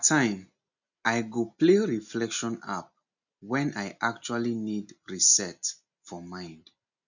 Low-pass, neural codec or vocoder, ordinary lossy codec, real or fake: 7.2 kHz; none; none; real